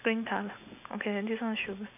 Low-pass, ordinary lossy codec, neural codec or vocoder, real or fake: 3.6 kHz; none; none; real